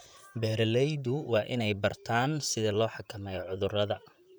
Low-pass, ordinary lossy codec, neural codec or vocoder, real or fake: none; none; vocoder, 44.1 kHz, 128 mel bands, Pupu-Vocoder; fake